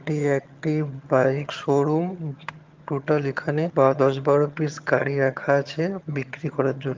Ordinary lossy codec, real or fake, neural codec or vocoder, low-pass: Opus, 32 kbps; fake; vocoder, 22.05 kHz, 80 mel bands, HiFi-GAN; 7.2 kHz